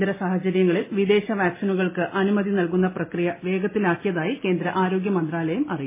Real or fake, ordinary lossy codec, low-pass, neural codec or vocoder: real; MP3, 16 kbps; 3.6 kHz; none